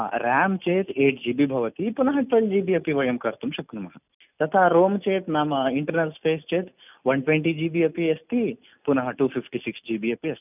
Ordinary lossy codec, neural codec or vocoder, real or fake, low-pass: none; none; real; 3.6 kHz